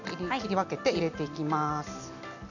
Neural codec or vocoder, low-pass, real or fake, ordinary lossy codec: none; 7.2 kHz; real; none